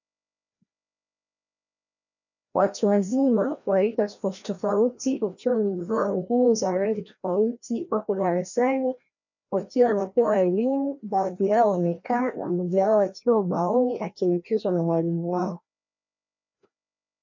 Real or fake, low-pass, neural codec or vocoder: fake; 7.2 kHz; codec, 16 kHz, 1 kbps, FreqCodec, larger model